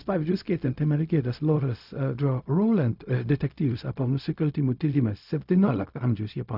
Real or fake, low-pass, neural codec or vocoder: fake; 5.4 kHz; codec, 16 kHz, 0.4 kbps, LongCat-Audio-Codec